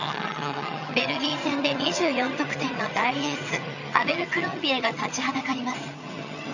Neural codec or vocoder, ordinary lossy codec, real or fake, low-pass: vocoder, 22.05 kHz, 80 mel bands, HiFi-GAN; none; fake; 7.2 kHz